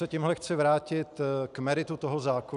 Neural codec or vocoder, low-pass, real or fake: none; 10.8 kHz; real